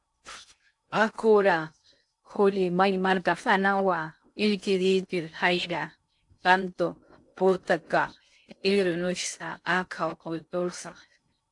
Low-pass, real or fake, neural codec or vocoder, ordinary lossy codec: 10.8 kHz; fake; codec, 16 kHz in and 24 kHz out, 0.6 kbps, FocalCodec, streaming, 2048 codes; AAC, 64 kbps